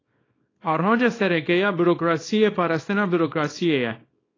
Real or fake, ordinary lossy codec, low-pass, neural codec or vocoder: fake; AAC, 32 kbps; 7.2 kHz; codec, 24 kHz, 0.9 kbps, WavTokenizer, small release